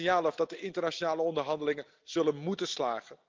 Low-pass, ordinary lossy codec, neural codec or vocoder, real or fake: 7.2 kHz; Opus, 24 kbps; none; real